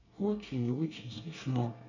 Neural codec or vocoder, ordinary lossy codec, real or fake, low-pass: codec, 24 kHz, 1 kbps, SNAC; none; fake; 7.2 kHz